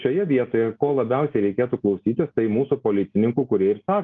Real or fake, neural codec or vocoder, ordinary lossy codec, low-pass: real; none; Opus, 32 kbps; 7.2 kHz